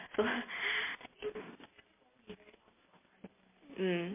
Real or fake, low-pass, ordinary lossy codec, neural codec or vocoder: real; 3.6 kHz; MP3, 24 kbps; none